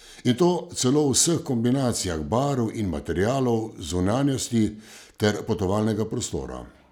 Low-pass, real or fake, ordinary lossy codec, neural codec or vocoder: 19.8 kHz; real; none; none